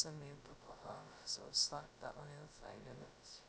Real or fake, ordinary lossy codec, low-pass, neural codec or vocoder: fake; none; none; codec, 16 kHz, 0.2 kbps, FocalCodec